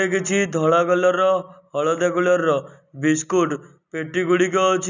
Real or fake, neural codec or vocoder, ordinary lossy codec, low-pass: real; none; none; 7.2 kHz